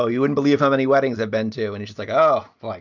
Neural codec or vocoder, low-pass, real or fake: none; 7.2 kHz; real